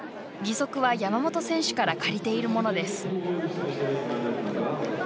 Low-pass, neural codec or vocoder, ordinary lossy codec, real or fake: none; none; none; real